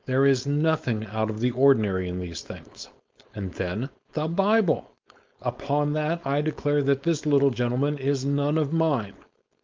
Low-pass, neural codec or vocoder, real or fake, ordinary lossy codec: 7.2 kHz; codec, 16 kHz, 4.8 kbps, FACodec; fake; Opus, 24 kbps